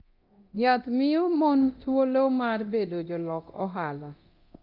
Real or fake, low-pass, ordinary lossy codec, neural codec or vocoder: fake; 5.4 kHz; Opus, 32 kbps; codec, 24 kHz, 0.9 kbps, DualCodec